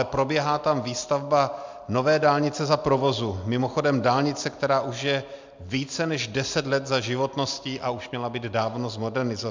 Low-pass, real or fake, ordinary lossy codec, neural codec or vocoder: 7.2 kHz; real; MP3, 64 kbps; none